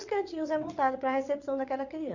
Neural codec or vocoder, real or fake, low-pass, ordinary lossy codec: vocoder, 22.05 kHz, 80 mel bands, WaveNeXt; fake; 7.2 kHz; none